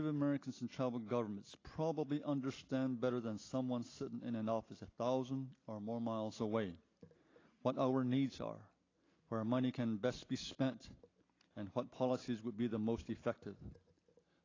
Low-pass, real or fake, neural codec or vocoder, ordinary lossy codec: 7.2 kHz; real; none; AAC, 32 kbps